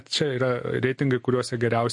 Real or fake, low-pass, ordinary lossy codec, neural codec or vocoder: real; 10.8 kHz; MP3, 48 kbps; none